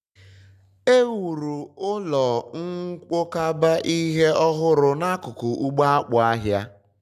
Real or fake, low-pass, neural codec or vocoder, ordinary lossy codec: real; 14.4 kHz; none; none